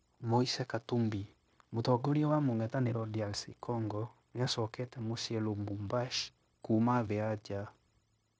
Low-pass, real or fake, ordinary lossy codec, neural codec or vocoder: none; fake; none; codec, 16 kHz, 0.9 kbps, LongCat-Audio-Codec